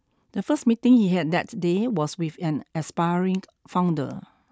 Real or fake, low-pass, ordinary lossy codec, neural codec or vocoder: real; none; none; none